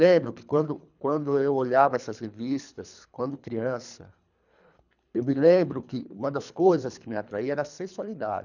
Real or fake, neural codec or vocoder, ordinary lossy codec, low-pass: fake; codec, 24 kHz, 3 kbps, HILCodec; none; 7.2 kHz